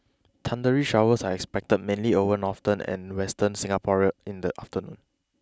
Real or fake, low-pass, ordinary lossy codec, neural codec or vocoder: real; none; none; none